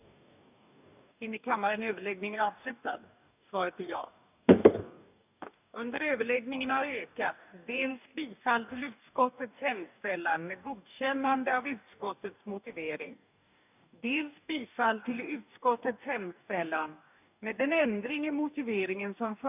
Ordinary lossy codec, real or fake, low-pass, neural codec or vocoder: none; fake; 3.6 kHz; codec, 44.1 kHz, 2.6 kbps, DAC